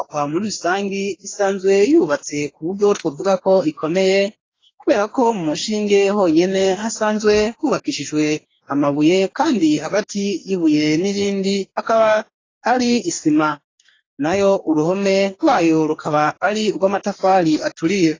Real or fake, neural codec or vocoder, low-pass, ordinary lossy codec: fake; codec, 44.1 kHz, 2.6 kbps, DAC; 7.2 kHz; AAC, 32 kbps